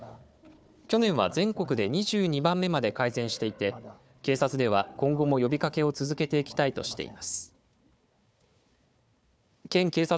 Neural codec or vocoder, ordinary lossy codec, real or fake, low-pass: codec, 16 kHz, 4 kbps, FunCodec, trained on Chinese and English, 50 frames a second; none; fake; none